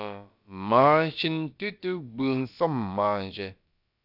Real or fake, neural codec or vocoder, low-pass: fake; codec, 16 kHz, about 1 kbps, DyCAST, with the encoder's durations; 5.4 kHz